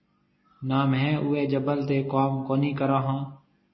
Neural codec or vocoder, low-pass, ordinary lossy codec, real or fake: none; 7.2 kHz; MP3, 24 kbps; real